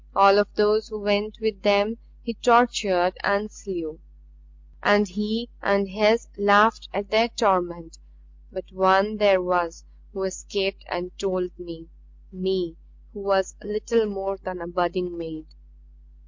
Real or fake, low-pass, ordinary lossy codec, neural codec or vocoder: real; 7.2 kHz; MP3, 48 kbps; none